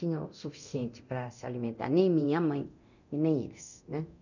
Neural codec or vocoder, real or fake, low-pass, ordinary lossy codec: codec, 24 kHz, 0.9 kbps, DualCodec; fake; 7.2 kHz; none